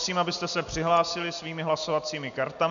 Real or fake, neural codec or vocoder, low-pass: real; none; 7.2 kHz